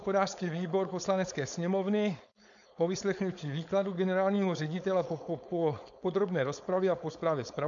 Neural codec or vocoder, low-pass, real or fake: codec, 16 kHz, 4.8 kbps, FACodec; 7.2 kHz; fake